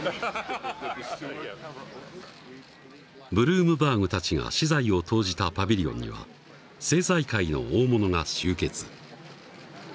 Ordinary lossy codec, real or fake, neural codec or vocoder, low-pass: none; real; none; none